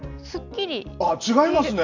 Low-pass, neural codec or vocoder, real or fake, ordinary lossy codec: 7.2 kHz; none; real; none